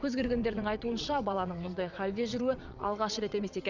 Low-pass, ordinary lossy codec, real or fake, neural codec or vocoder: 7.2 kHz; none; fake; vocoder, 22.05 kHz, 80 mel bands, WaveNeXt